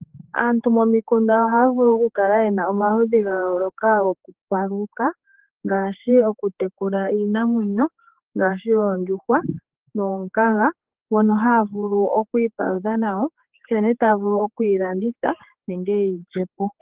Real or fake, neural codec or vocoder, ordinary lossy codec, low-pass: fake; codec, 16 kHz, 4 kbps, X-Codec, HuBERT features, trained on general audio; Opus, 16 kbps; 3.6 kHz